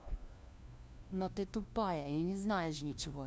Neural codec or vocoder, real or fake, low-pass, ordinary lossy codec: codec, 16 kHz, 1 kbps, FunCodec, trained on LibriTTS, 50 frames a second; fake; none; none